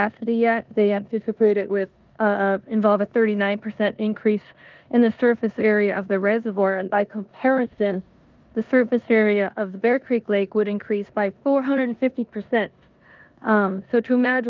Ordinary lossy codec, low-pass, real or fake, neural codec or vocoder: Opus, 24 kbps; 7.2 kHz; fake; codec, 16 kHz in and 24 kHz out, 0.9 kbps, LongCat-Audio-Codec, four codebook decoder